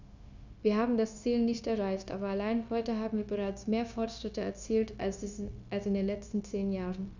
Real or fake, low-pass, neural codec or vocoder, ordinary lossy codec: fake; 7.2 kHz; codec, 16 kHz, 0.9 kbps, LongCat-Audio-Codec; none